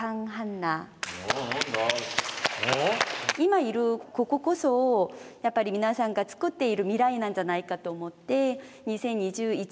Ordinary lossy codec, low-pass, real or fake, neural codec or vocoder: none; none; real; none